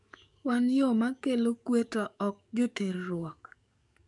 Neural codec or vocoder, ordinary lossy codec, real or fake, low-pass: codec, 44.1 kHz, 7.8 kbps, DAC; none; fake; 10.8 kHz